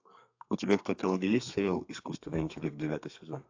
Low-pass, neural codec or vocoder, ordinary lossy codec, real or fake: 7.2 kHz; codec, 32 kHz, 1.9 kbps, SNAC; AAC, 48 kbps; fake